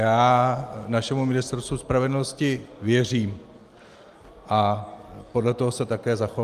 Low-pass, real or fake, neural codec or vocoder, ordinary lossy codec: 14.4 kHz; real; none; Opus, 32 kbps